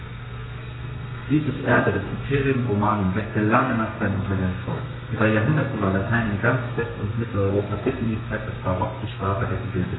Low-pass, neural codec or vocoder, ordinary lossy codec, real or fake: 7.2 kHz; codec, 32 kHz, 1.9 kbps, SNAC; AAC, 16 kbps; fake